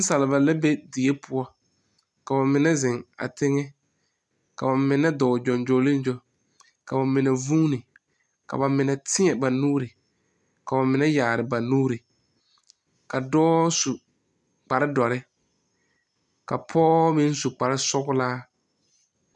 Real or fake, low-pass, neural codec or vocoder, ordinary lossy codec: real; 10.8 kHz; none; MP3, 96 kbps